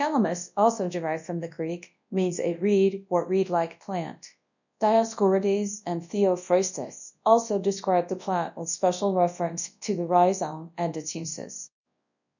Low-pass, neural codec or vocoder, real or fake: 7.2 kHz; codec, 24 kHz, 0.9 kbps, WavTokenizer, large speech release; fake